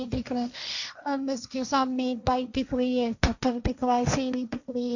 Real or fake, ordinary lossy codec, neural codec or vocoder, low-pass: fake; none; codec, 16 kHz, 1.1 kbps, Voila-Tokenizer; 7.2 kHz